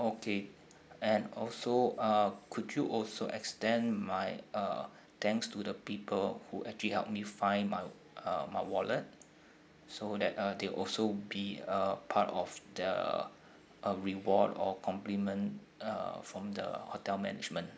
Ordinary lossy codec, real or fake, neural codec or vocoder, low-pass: none; real; none; none